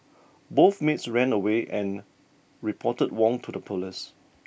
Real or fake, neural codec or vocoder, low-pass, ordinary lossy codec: real; none; none; none